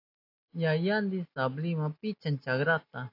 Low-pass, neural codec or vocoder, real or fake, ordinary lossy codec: 5.4 kHz; none; real; AAC, 32 kbps